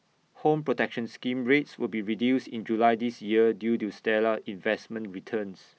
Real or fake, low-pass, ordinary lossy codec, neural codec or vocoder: real; none; none; none